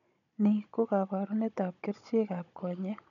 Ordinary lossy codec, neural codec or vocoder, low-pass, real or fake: none; codec, 16 kHz, 16 kbps, FunCodec, trained on Chinese and English, 50 frames a second; 7.2 kHz; fake